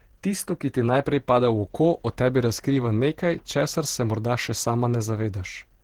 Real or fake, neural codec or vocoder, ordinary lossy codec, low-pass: fake; vocoder, 48 kHz, 128 mel bands, Vocos; Opus, 16 kbps; 19.8 kHz